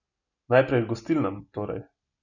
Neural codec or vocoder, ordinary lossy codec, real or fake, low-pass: none; none; real; 7.2 kHz